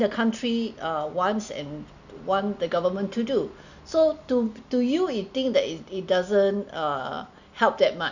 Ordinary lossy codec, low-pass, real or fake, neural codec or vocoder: none; 7.2 kHz; real; none